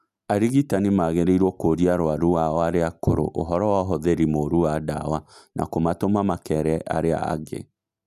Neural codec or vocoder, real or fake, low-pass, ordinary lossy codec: none; real; 14.4 kHz; none